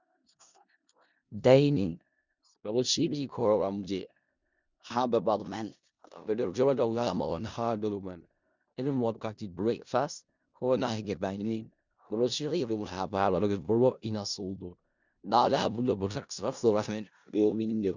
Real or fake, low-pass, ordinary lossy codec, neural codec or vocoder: fake; 7.2 kHz; Opus, 64 kbps; codec, 16 kHz in and 24 kHz out, 0.4 kbps, LongCat-Audio-Codec, four codebook decoder